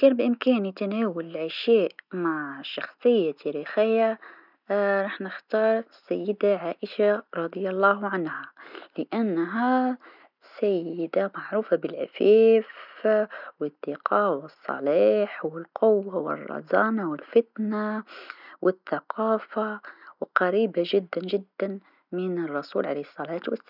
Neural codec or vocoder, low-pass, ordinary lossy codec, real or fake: none; 5.4 kHz; none; real